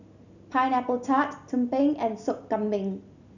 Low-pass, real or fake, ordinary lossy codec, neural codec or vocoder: 7.2 kHz; real; none; none